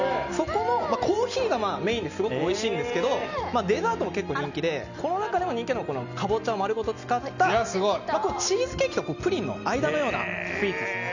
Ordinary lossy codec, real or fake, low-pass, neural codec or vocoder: none; real; 7.2 kHz; none